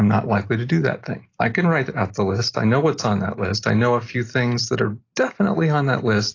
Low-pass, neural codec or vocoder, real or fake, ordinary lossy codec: 7.2 kHz; none; real; AAC, 32 kbps